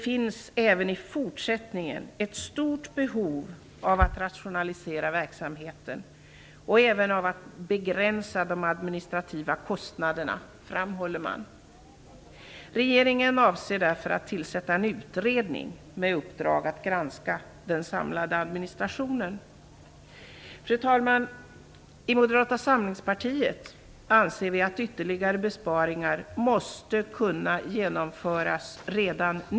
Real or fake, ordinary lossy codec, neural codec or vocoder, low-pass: real; none; none; none